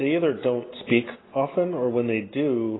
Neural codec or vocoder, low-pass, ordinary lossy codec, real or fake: none; 7.2 kHz; AAC, 16 kbps; real